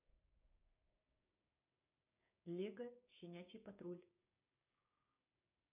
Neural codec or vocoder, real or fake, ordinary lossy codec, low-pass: codec, 16 kHz, 6 kbps, DAC; fake; none; 3.6 kHz